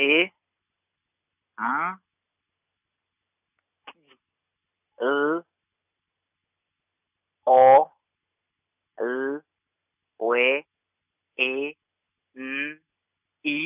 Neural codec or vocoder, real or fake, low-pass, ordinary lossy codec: none; real; 3.6 kHz; none